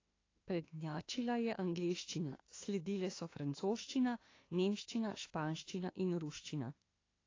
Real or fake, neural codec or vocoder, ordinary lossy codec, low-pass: fake; autoencoder, 48 kHz, 32 numbers a frame, DAC-VAE, trained on Japanese speech; AAC, 32 kbps; 7.2 kHz